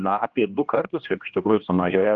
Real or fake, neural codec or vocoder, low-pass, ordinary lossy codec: fake; codec, 16 kHz, 1 kbps, X-Codec, HuBERT features, trained on general audio; 7.2 kHz; Opus, 32 kbps